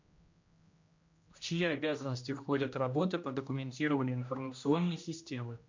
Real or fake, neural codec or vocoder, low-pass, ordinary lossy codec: fake; codec, 16 kHz, 1 kbps, X-Codec, HuBERT features, trained on general audio; 7.2 kHz; MP3, 48 kbps